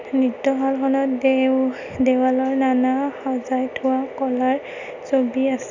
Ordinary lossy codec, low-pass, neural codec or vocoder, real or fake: none; 7.2 kHz; none; real